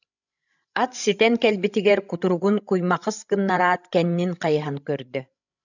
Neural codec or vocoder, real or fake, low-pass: codec, 16 kHz, 16 kbps, FreqCodec, larger model; fake; 7.2 kHz